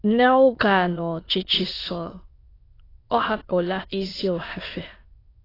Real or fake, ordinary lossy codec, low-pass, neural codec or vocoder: fake; AAC, 24 kbps; 5.4 kHz; autoencoder, 22.05 kHz, a latent of 192 numbers a frame, VITS, trained on many speakers